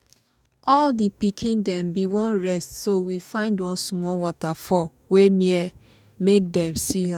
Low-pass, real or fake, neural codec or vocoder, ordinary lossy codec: 19.8 kHz; fake; codec, 44.1 kHz, 2.6 kbps, DAC; none